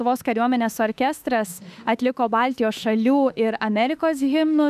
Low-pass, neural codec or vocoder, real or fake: 14.4 kHz; autoencoder, 48 kHz, 32 numbers a frame, DAC-VAE, trained on Japanese speech; fake